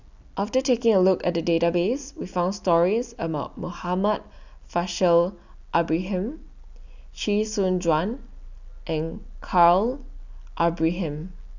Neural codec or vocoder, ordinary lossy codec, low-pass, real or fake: none; none; 7.2 kHz; real